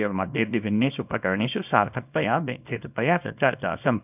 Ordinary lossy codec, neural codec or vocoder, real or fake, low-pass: none; codec, 24 kHz, 0.9 kbps, WavTokenizer, small release; fake; 3.6 kHz